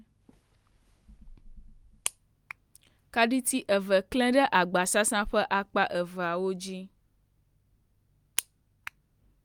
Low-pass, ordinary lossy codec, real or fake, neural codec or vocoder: 19.8 kHz; Opus, 32 kbps; real; none